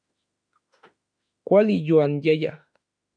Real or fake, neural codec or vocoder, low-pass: fake; autoencoder, 48 kHz, 32 numbers a frame, DAC-VAE, trained on Japanese speech; 9.9 kHz